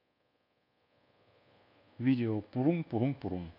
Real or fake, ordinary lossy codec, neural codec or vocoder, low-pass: fake; none; codec, 24 kHz, 1.2 kbps, DualCodec; 5.4 kHz